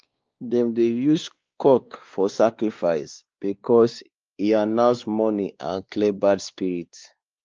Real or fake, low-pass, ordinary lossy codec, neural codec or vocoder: fake; 7.2 kHz; Opus, 24 kbps; codec, 16 kHz, 2 kbps, X-Codec, WavLM features, trained on Multilingual LibriSpeech